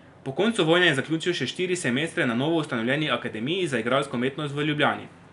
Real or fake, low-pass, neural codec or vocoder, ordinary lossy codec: real; 10.8 kHz; none; none